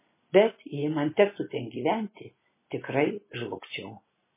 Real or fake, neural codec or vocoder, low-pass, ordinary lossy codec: real; none; 3.6 kHz; MP3, 16 kbps